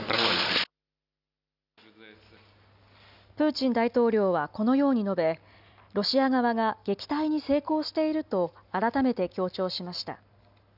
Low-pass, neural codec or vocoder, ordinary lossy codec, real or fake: 5.4 kHz; none; none; real